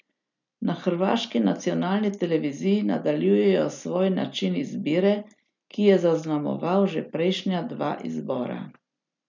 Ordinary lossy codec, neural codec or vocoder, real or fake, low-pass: none; none; real; 7.2 kHz